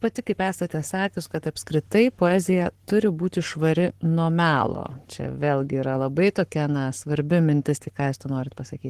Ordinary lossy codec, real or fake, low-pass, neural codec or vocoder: Opus, 16 kbps; fake; 14.4 kHz; codec, 44.1 kHz, 7.8 kbps, Pupu-Codec